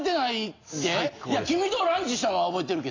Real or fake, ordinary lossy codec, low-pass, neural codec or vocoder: real; AAC, 32 kbps; 7.2 kHz; none